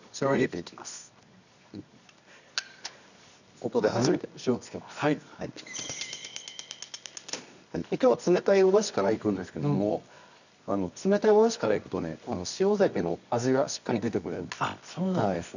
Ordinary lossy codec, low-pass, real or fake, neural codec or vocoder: none; 7.2 kHz; fake; codec, 24 kHz, 0.9 kbps, WavTokenizer, medium music audio release